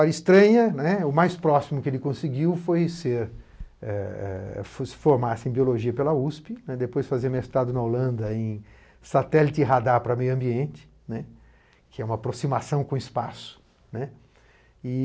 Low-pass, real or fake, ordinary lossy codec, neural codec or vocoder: none; real; none; none